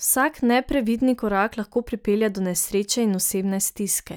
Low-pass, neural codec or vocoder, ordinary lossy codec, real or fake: none; none; none; real